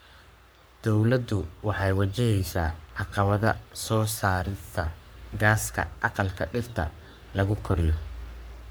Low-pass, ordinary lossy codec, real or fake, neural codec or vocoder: none; none; fake; codec, 44.1 kHz, 3.4 kbps, Pupu-Codec